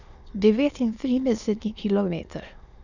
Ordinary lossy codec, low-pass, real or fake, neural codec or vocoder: none; 7.2 kHz; fake; autoencoder, 22.05 kHz, a latent of 192 numbers a frame, VITS, trained on many speakers